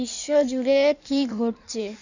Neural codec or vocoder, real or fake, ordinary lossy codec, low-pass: codec, 16 kHz in and 24 kHz out, 1.1 kbps, FireRedTTS-2 codec; fake; none; 7.2 kHz